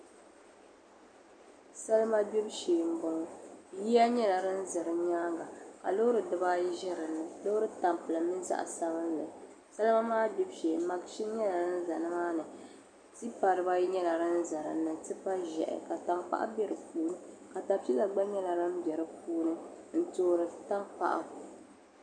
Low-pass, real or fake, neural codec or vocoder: 9.9 kHz; real; none